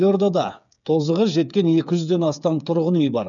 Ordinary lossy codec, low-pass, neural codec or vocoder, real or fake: none; 7.2 kHz; codec, 16 kHz, 8 kbps, FreqCodec, smaller model; fake